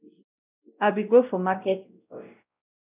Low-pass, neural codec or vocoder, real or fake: 3.6 kHz; codec, 16 kHz, 0.5 kbps, X-Codec, WavLM features, trained on Multilingual LibriSpeech; fake